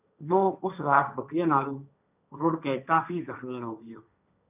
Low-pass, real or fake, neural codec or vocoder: 3.6 kHz; fake; codec, 16 kHz, 1.1 kbps, Voila-Tokenizer